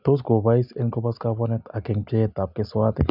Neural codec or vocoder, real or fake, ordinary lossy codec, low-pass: none; real; none; 5.4 kHz